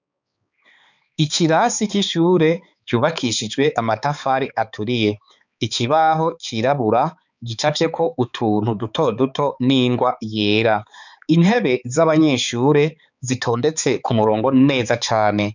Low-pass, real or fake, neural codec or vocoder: 7.2 kHz; fake; codec, 16 kHz, 4 kbps, X-Codec, HuBERT features, trained on balanced general audio